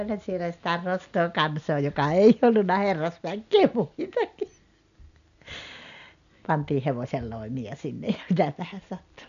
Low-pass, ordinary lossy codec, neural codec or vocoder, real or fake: 7.2 kHz; none; none; real